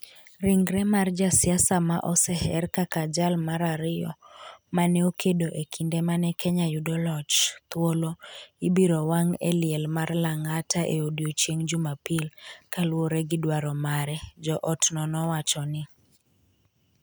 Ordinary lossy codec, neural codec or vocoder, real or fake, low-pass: none; none; real; none